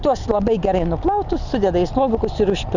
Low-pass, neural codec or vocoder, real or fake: 7.2 kHz; none; real